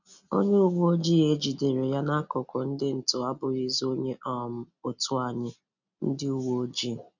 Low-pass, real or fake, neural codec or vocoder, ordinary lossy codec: 7.2 kHz; real; none; none